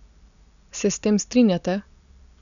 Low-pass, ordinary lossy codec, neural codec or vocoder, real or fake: 7.2 kHz; MP3, 96 kbps; none; real